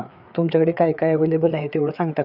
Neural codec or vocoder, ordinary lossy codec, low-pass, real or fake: codec, 16 kHz, 4 kbps, FreqCodec, larger model; none; 5.4 kHz; fake